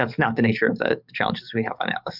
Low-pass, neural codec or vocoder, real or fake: 5.4 kHz; codec, 44.1 kHz, 7.8 kbps, DAC; fake